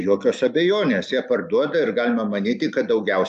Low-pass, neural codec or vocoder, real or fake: 10.8 kHz; none; real